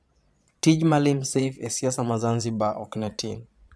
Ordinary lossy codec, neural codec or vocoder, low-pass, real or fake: none; vocoder, 22.05 kHz, 80 mel bands, Vocos; none; fake